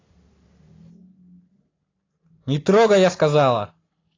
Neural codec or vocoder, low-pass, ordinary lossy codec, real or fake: none; 7.2 kHz; AAC, 32 kbps; real